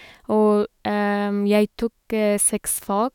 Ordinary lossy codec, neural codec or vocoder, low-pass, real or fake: none; autoencoder, 48 kHz, 128 numbers a frame, DAC-VAE, trained on Japanese speech; 19.8 kHz; fake